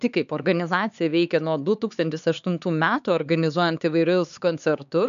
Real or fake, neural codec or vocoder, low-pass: fake; codec, 16 kHz, 4 kbps, X-Codec, HuBERT features, trained on LibriSpeech; 7.2 kHz